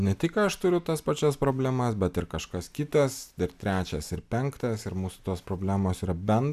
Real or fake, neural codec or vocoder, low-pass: real; none; 14.4 kHz